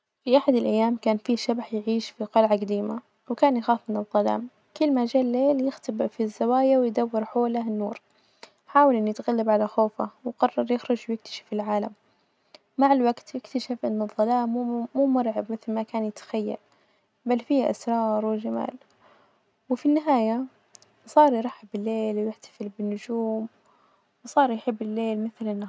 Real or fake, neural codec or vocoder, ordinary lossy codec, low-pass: real; none; none; none